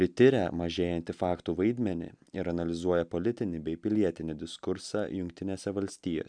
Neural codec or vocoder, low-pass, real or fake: none; 9.9 kHz; real